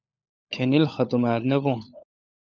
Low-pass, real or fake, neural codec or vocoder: 7.2 kHz; fake; codec, 16 kHz, 16 kbps, FunCodec, trained on LibriTTS, 50 frames a second